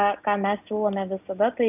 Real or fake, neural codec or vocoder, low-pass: real; none; 3.6 kHz